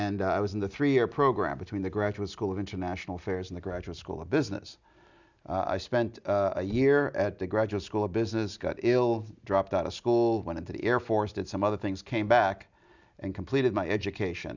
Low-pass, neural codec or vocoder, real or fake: 7.2 kHz; none; real